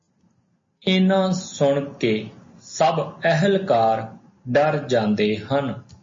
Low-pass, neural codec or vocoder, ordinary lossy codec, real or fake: 7.2 kHz; none; MP3, 32 kbps; real